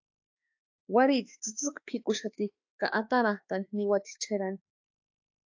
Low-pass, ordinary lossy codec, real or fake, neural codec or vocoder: 7.2 kHz; AAC, 48 kbps; fake; autoencoder, 48 kHz, 32 numbers a frame, DAC-VAE, trained on Japanese speech